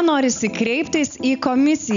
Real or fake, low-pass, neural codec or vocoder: real; 7.2 kHz; none